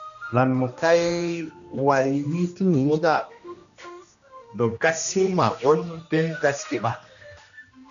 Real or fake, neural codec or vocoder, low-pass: fake; codec, 16 kHz, 1 kbps, X-Codec, HuBERT features, trained on general audio; 7.2 kHz